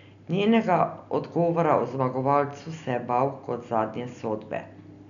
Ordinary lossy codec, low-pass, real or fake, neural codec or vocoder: none; 7.2 kHz; real; none